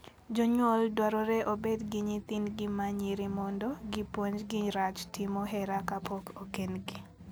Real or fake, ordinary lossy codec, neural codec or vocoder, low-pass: real; none; none; none